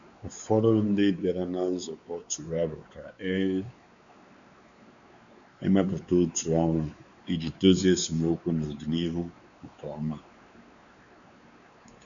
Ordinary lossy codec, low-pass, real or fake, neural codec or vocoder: none; 7.2 kHz; fake; codec, 16 kHz, 4 kbps, X-Codec, WavLM features, trained on Multilingual LibriSpeech